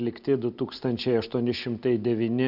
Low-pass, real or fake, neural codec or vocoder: 5.4 kHz; real; none